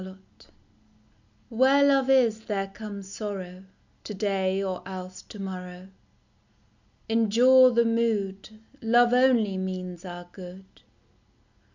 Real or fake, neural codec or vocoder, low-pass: real; none; 7.2 kHz